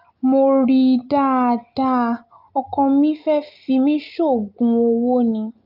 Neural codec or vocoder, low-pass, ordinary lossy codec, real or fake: none; 5.4 kHz; Opus, 24 kbps; real